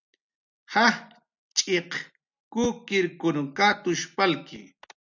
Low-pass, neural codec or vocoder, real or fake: 7.2 kHz; none; real